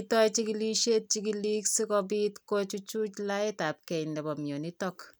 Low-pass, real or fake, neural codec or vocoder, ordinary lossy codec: none; real; none; none